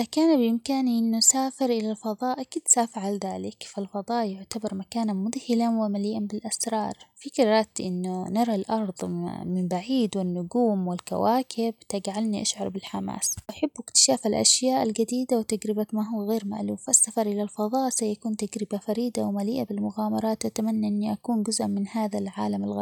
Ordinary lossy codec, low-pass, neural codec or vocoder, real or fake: none; 19.8 kHz; none; real